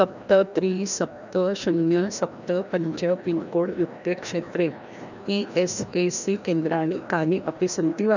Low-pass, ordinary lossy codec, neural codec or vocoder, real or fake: 7.2 kHz; none; codec, 16 kHz, 1 kbps, FreqCodec, larger model; fake